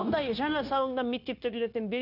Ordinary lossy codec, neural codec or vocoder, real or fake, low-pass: none; codec, 16 kHz, 0.9 kbps, LongCat-Audio-Codec; fake; 5.4 kHz